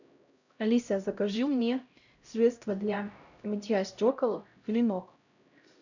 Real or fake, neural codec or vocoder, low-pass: fake; codec, 16 kHz, 0.5 kbps, X-Codec, HuBERT features, trained on LibriSpeech; 7.2 kHz